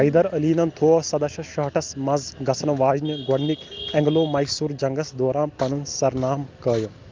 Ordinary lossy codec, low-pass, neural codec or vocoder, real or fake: Opus, 24 kbps; 7.2 kHz; none; real